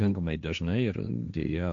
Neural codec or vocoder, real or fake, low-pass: codec, 16 kHz, 1.1 kbps, Voila-Tokenizer; fake; 7.2 kHz